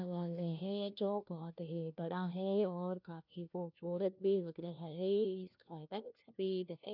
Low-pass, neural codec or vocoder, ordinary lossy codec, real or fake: 5.4 kHz; codec, 16 kHz, 1 kbps, FunCodec, trained on LibriTTS, 50 frames a second; none; fake